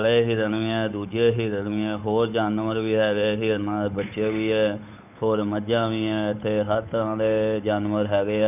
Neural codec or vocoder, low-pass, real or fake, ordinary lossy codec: codec, 16 kHz, 8 kbps, FunCodec, trained on Chinese and English, 25 frames a second; 3.6 kHz; fake; none